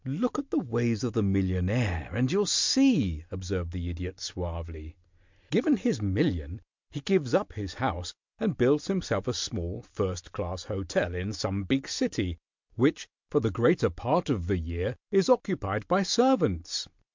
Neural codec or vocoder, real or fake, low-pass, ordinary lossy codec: none; real; 7.2 kHz; MP3, 64 kbps